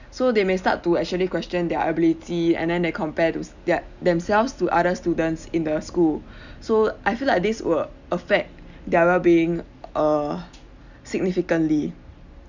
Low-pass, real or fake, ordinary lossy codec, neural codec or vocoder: 7.2 kHz; real; none; none